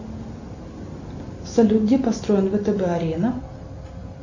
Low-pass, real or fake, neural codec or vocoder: 7.2 kHz; real; none